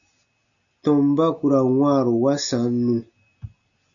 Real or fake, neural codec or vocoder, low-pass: real; none; 7.2 kHz